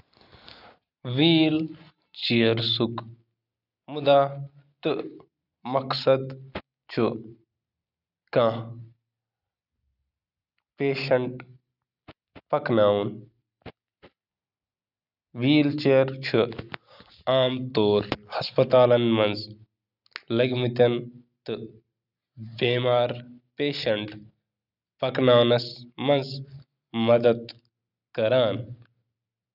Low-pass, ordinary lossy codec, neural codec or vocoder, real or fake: 5.4 kHz; none; none; real